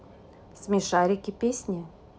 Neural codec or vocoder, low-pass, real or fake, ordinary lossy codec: none; none; real; none